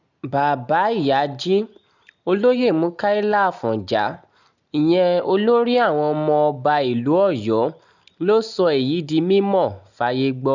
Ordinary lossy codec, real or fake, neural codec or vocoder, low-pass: none; real; none; 7.2 kHz